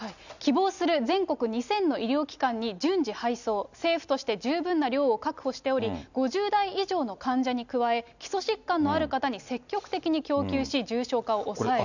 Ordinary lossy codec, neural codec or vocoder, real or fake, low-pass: none; none; real; 7.2 kHz